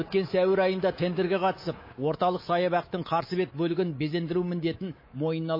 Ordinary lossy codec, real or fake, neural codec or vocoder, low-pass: MP3, 24 kbps; fake; codec, 24 kHz, 3.1 kbps, DualCodec; 5.4 kHz